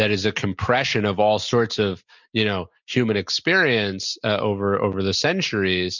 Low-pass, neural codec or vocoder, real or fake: 7.2 kHz; none; real